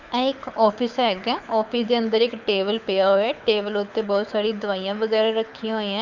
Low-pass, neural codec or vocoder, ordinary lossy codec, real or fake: 7.2 kHz; codec, 24 kHz, 6 kbps, HILCodec; none; fake